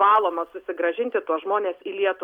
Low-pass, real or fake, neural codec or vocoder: 19.8 kHz; real; none